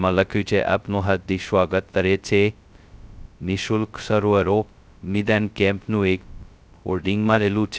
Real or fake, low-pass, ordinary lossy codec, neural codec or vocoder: fake; none; none; codec, 16 kHz, 0.2 kbps, FocalCodec